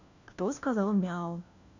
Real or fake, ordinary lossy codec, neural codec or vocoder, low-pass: fake; none; codec, 16 kHz, 1 kbps, FunCodec, trained on LibriTTS, 50 frames a second; 7.2 kHz